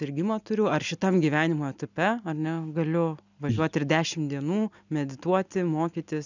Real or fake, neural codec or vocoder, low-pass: real; none; 7.2 kHz